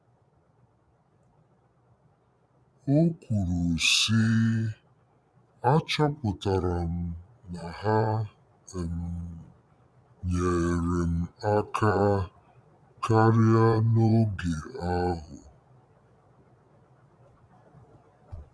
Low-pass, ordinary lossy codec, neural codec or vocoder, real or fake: none; none; vocoder, 22.05 kHz, 80 mel bands, Vocos; fake